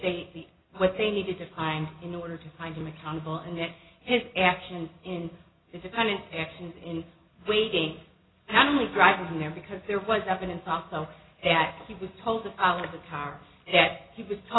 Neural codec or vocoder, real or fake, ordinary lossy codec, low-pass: none; real; AAC, 16 kbps; 7.2 kHz